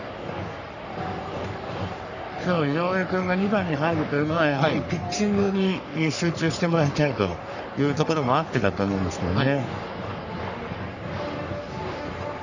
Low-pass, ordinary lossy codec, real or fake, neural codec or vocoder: 7.2 kHz; none; fake; codec, 44.1 kHz, 3.4 kbps, Pupu-Codec